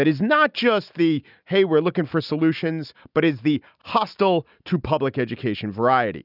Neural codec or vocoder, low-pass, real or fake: none; 5.4 kHz; real